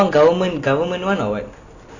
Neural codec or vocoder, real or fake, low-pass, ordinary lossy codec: none; real; 7.2 kHz; none